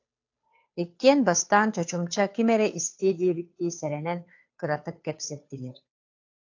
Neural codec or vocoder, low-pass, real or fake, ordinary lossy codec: codec, 16 kHz, 2 kbps, FunCodec, trained on Chinese and English, 25 frames a second; 7.2 kHz; fake; AAC, 48 kbps